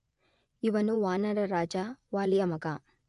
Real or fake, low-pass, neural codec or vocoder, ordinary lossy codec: fake; 10.8 kHz; vocoder, 24 kHz, 100 mel bands, Vocos; none